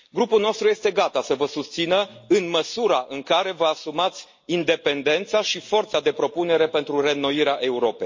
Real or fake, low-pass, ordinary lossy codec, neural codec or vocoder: real; 7.2 kHz; MP3, 32 kbps; none